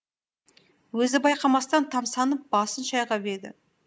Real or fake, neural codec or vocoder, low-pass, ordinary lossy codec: real; none; none; none